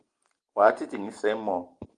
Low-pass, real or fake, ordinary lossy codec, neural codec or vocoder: 9.9 kHz; real; Opus, 24 kbps; none